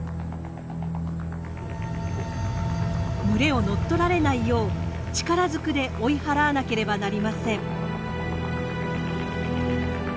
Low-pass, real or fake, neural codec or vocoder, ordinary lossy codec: none; real; none; none